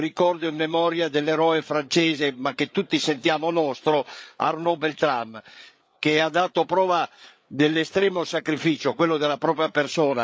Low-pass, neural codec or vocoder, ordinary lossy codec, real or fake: none; codec, 16 kHz, 8 kbps, FreqCodec, larger model; none; fake